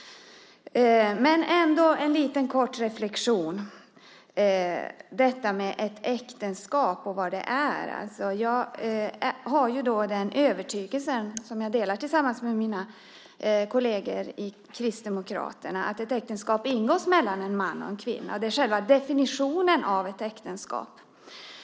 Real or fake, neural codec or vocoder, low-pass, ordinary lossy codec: real; none; none; none